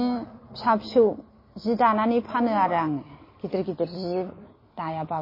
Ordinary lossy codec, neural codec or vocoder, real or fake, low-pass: MP3, 24 kbps; none; real; 5.4 kHz